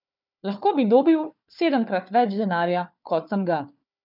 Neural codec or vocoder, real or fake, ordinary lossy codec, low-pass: codec, 16 kHz, 4 kbps, FunCodec, trained on Chinese and English, 50 frames a second; fake; none; 5.4 kHz